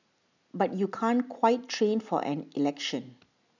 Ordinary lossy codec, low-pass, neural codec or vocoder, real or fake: none; 7.2 kHz; none; real